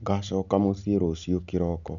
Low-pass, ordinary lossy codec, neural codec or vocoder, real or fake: 7.2 kHz; none; none; real